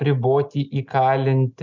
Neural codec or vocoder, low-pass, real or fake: none; 7.2 kHz; real